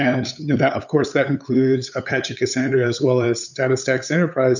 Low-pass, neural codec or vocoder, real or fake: 7.2 kHz; codec, 16 kHz, 8 kbps, FunCodec, trained on LibriTTS, 25 frames a second; fake